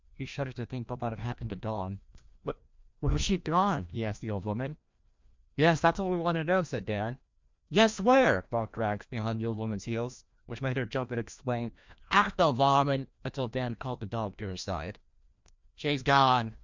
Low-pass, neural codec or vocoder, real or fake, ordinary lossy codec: 7.2 kHz; codec, 16 kHz, 1 kbps, FreqCodec, larger model; fake; MP3, 64 kbps